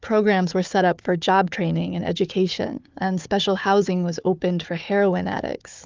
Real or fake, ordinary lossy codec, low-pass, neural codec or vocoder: fake; Opus, 24 kbps; 7.2 kHz; codec, 16 kHz, 4 kbps, FunCodec, trained on Chinese and English, 50 frames a second